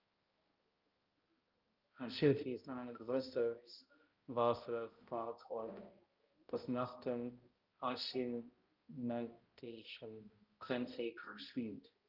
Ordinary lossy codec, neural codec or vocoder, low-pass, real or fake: Opus, 32 kbps; codec, 16 kHz, 0.5 kbps, X-Codec, HuBERT features, trained on balanced general audio; 5.4 kHz; fake